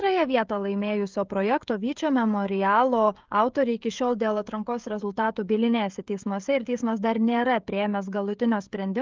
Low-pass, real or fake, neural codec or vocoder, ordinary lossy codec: 7.2 kHz; fake; codec, 16 kHz, 16 kbps, FreqCodec, smaller model; Opus, 32 kbps